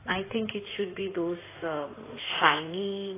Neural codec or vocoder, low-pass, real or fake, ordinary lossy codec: codec, 16 kHz in and 24 kHz out, 2.2 kbps, FireRedTTS-2 codec; 3.6 kHz; fake; AAC, 16 kbps